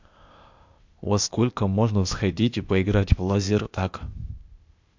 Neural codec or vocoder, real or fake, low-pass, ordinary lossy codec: codec, 16 kHz, 0.8 kbps, ZipCodec; fake; 7.2 kHz; MP3, 64 kbps